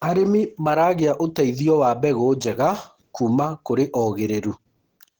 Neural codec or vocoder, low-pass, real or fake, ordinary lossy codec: none; 19.8 kHz; real; Opus, 16 kbps